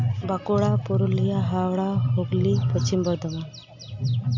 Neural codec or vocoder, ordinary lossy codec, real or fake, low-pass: none; AAC, 48 kbps; real; 7.2 kHz